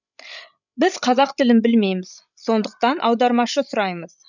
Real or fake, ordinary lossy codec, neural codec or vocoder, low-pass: fake; none; codec, 16 kHz, 16 kbps, FreqCodec, larger model; 7.2 kHz